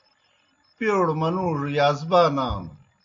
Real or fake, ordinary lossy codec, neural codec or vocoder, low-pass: real; AAC, 48 kbps; none; 7.2 kHz